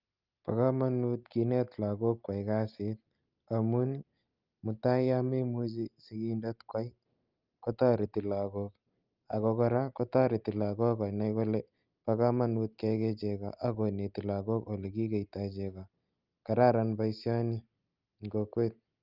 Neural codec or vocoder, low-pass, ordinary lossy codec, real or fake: none; 5.4 kHz; Opus, 24 kbps; real